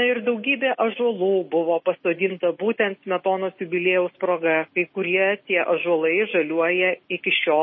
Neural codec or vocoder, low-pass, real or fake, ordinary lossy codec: none; 7.2 kHz; real; MP3, 24 kbps